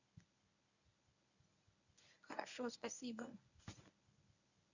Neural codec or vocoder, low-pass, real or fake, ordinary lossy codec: codec, 24 kHz, 0.9 kbps, WavTokenizer, medium speech release version 1; 7.2 kHz; fake; none